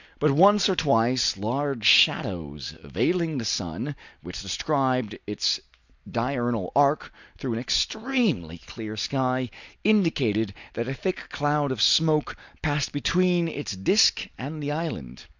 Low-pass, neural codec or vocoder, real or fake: 7.2 kHz; none; real